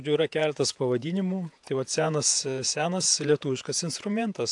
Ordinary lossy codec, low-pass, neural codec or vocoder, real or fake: AAC, 64 kbps; 10.8 kHz; vocoder, 48 kHz, 128 mel bands, Vocos; fake